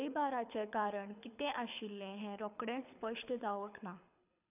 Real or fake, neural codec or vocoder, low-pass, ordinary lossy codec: fake; codec, 24 kHz, 6 kbps, HILCodec; 3.6 kHz; none